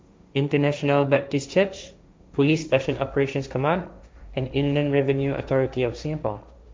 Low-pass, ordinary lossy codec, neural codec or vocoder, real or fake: none; none; codec, 16 kHz, 1.1 kbps, Voila-Tokenizer; fake